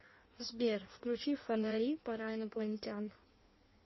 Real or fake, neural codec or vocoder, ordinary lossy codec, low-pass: fake; codec, 16 kHz in and 24 kHz out, 1.1 kbps, FireRedTTS-2 codec; MP3, 24 kbps; 7.2 kHz